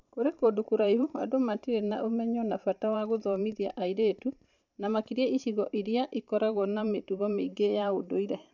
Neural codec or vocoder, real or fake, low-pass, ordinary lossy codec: vocoder, 44.1 kHz, 128 mel bands every 512 samples, BigVGAN v2; fake; 7.2 kHz; none